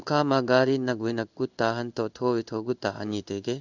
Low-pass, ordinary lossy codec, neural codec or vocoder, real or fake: 7.2 kHz; none; codec, 16 kHz in and 24 kHz out, 1 kbps, XY-Tokenizer; fake